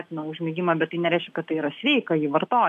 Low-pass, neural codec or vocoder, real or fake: 14.4 kHz; none; real